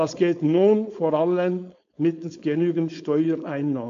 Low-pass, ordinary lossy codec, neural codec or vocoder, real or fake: 7.2 kHz; AAC, 48 kbps; codec, 16 kHz, 4.8 kbps, FACodec; fake